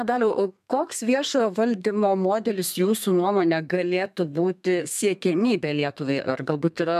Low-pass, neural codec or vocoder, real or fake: 14.4 kHz; codec, 32 kHz, 1.9 kbps, SNAC; fake